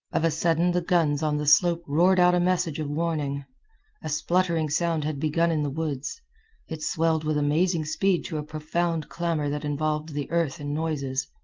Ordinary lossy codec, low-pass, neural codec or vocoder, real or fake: Opus, 32 kbps; 7.2 kHz; none; real